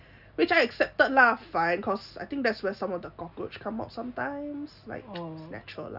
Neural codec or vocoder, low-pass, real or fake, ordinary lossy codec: none; 5.4 kHz; real; none